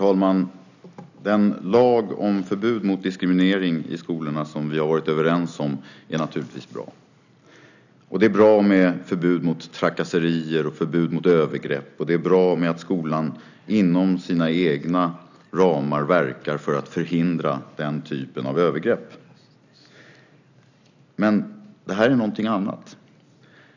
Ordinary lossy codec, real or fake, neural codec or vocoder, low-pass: none; real; none; 7.2 kHz